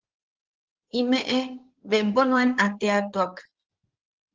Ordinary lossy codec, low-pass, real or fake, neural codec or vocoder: Opus, 16 kbps; 7.2 kHz; fake; codec, 16 kHz in and 24 kHz out, 2.2 kbps, FireRedTTS-2 codec